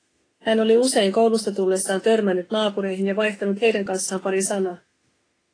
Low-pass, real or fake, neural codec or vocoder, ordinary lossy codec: 9.9 kHz; fake; autoencoder, 48 kHz, 32 numbers a frame, DAC-VAE, trained on Japanese speech; AAC, 32 kbps